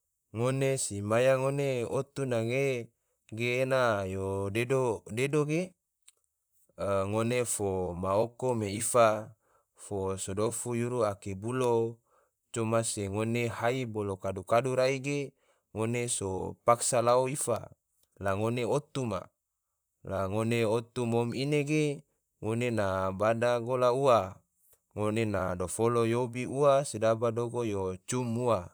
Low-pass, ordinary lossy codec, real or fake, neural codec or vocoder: none; none; fake; vocoder, 44.1 kHz, 128 mel bands, Pupu-Vocoder